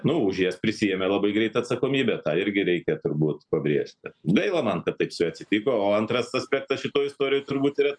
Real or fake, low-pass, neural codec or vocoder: real; 9.9 kHz; none